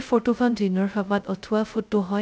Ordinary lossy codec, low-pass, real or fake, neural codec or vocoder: none; none; fake; codec, 16 kHz, 0.2 kbps, FocalCodec